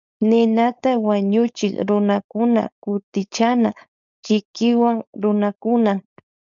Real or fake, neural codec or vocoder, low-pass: fake; codec, 16 kHz, 4.8 kbps, FACodec; 7.2 kHz